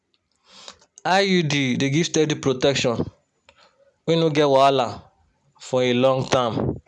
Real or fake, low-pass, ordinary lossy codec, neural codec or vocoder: real; 10.8 kHz; none; none